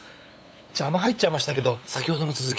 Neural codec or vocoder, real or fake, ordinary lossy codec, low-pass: codec, 16 kHz, 8 kbps, FunCodec, trained on LibriTTS, 25 frames a second; fake; none; none